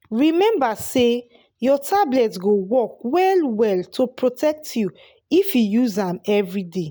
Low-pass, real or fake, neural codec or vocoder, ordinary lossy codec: none; real; none; none